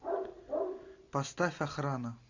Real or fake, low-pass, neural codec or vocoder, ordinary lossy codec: real; 7.2 kHz; none; AAC, 48 kbps